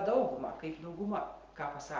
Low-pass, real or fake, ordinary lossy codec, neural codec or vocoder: 7.2 kHz; real; Opus, 16 kbps; none